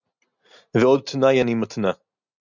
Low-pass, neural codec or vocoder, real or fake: 7.2 kHz; none; real